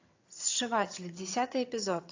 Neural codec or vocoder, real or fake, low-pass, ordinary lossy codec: vocoder, 22.05 kHz, 80 mel bands, HiFi-GAN; fake; 7.2 kHz; AAC, 48 kbps